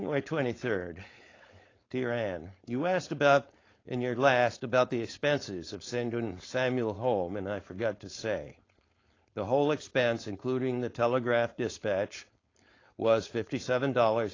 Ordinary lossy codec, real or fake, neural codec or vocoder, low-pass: AAC, 32 kbps; fake; codec, 16 kHz, 4.8 kbps, FACodec; 7.2 kHz